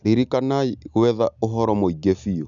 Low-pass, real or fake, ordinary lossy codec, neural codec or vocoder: 7.2 kHz; real; none; none